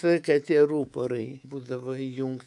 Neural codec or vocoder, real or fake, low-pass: codec, 24 kHz, 3.1 kbps, DualCodec; fake; 10.8 kHz